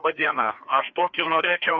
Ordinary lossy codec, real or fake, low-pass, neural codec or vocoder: AAC, 48 kbps; fake; 7.2 kHz; codec, 16 kHz, 2 kbps, FreqCodec, larger model